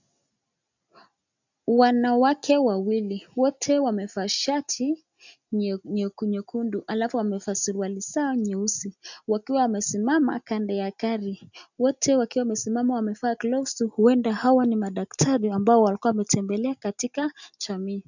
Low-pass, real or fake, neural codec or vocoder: 7.2 kHz; real; none